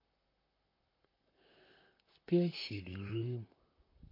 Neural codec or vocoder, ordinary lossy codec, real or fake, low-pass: vocoder, 44.1 kHz, 128 mel bands, Pupu-Vocoder; MP3, 32 kbps; fake; 5.4 kHz